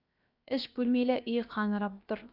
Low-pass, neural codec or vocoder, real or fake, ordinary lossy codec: 5.4 kHz; codec, 16 kHz, 0.7 kbps, FocalCodec; fake; none